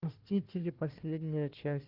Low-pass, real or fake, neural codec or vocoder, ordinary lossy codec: 5.4 kHz; fake; codec, 16 kHz, 1 kbps, FunCodec, trained on Chinese and English, 50 frames a second; Opus, 16 kbps